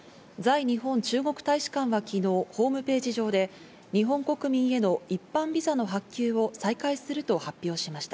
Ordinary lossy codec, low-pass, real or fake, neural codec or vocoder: none; none; real; none